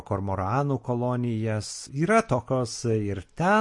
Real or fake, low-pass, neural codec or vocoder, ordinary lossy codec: real; 10.8 kHz; none; MP3, 48 kbps